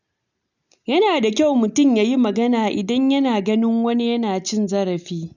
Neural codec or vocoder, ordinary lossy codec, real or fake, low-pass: none; none; real; 7.2 kHz